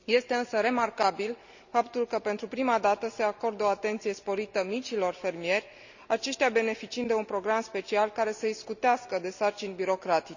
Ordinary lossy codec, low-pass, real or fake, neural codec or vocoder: none; 7.2 kHz; real; none